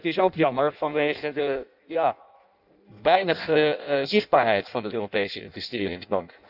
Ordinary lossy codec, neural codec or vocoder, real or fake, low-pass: none; codec, 16 kHz in and 24 kHz out, 0.6 kbps, FireRedTTS-2 codec; fake; 5.4 kHz